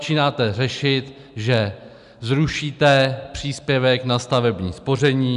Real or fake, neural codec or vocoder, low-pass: real; none; 10.8 kHz